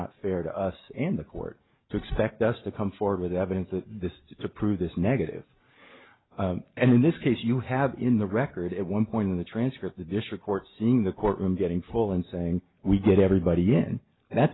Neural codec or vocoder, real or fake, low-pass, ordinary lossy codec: none; real; 7.2 kHz; AAC, 16 kbps